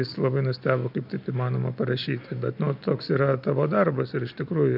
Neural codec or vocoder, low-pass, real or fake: none; 5.4 kHz; real